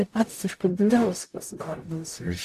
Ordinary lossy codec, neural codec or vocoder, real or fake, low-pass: AAC, 64 kbps; codec, 44.1 kHz, 0.9 kbps, DAC; fake; 14.4 kHz